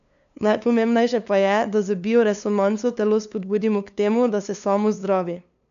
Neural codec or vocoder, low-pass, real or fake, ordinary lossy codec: codec, 16 kHz, 2 kbps, FunCodec, trained on LibriTTS, 25 frames a second; 7.2 kHz; fake; none